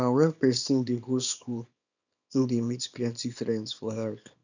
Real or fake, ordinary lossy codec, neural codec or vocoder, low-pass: fake; none; codec, 24 kHz, 0.9 kbps, WavTokenizer, small release; 7.2 kHz